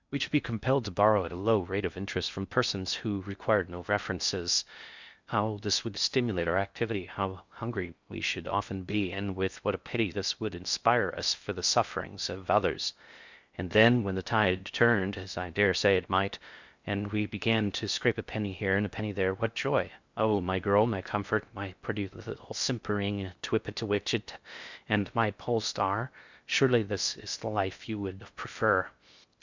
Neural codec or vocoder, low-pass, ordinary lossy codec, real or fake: codec, 16 kHz in and 24 kHz out, 0.6 kbps, FocalCodec, streaming, 2048 codes; 7.2 kHz; Opus, 64 kbps; fake